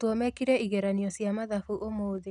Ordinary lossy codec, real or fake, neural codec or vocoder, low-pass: none; real; none; none